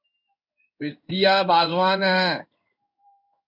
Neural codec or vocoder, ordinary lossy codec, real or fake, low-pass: codec, 16 kHz in and 24 kHz out, 1 kbps, XY-Tokenizer; MP3, 48 kbps; fake; 5.4 kHz